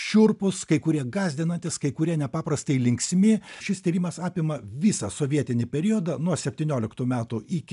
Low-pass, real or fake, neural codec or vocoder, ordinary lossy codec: 10.8 kHz; real; none; MP3, 96 kbps